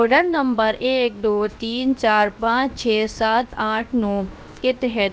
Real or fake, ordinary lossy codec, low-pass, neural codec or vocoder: fake; none; none; codec, 16 kHz, 0.7 kbps, FocalCodec